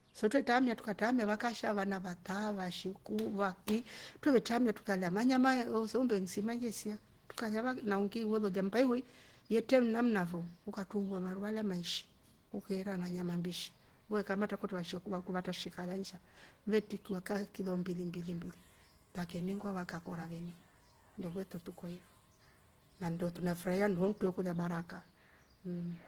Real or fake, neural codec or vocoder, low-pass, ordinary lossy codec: real; none; 19.8 kHz; Opus, 16 kbps